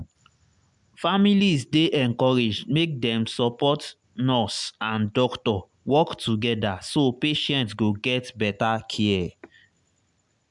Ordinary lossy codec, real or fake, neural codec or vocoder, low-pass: MP3, 96 kbps; real; none; 10.8 kHz